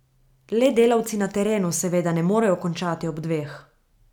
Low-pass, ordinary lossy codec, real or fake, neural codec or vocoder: 19.8 kHz; none; real; none